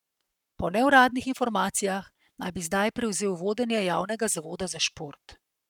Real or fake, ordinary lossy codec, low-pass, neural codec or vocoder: fake; none; 19.8 kHz; codec, 44.1 kHz, 7.8 kbps, Pupu-Codec